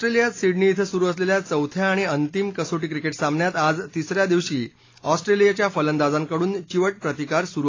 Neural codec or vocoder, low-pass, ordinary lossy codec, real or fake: none; 7.2 kHz; AAC, 32 kbps; real